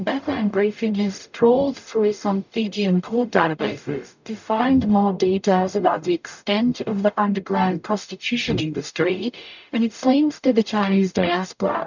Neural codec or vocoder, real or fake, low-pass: codec, 44.1 kHz, 0.9 kbps, DAC; fake; 7.2 kHz